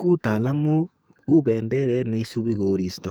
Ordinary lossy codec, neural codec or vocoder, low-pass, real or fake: none; codec, 44.1 kHz, 2.6 kbps, SNAC; none; fake